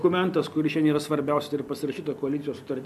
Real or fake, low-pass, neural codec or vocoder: real; 14.4 kHz; none